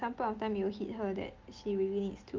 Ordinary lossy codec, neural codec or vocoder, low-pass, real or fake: Opus, 32 kbps; none; 7.2 kHz; real